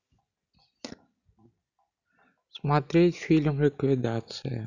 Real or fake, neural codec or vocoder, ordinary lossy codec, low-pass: real; none; none; 7.2 kHz